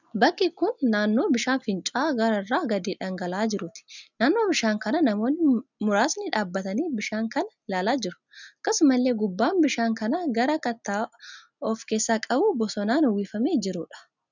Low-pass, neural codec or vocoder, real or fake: 7.2 kHz; none; real